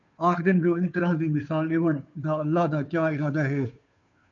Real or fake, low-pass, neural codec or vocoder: fake; 7.2 kHz; codec, 16 kHz, 2 kbps, FunCodec, trained on Chinese and English, 25 frames a second